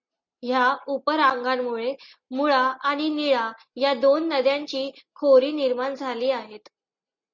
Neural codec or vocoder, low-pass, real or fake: none; 7.2 kHz; real